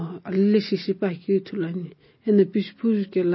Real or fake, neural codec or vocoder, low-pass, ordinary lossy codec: real; none; 7.2 kHz; MP3, 24 kbps